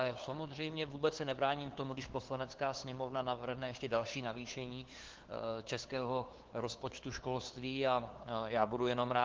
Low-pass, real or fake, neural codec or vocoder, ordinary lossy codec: 7.2 kHz; fake; codec, 16 kHz, 2 kbps, FunCodec, trained on LibriTTS, 25 frames a second; Opus, 16 kbps